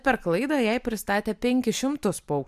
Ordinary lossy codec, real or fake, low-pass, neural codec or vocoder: MP3, 96 kbps; real; 14.4 kHz; none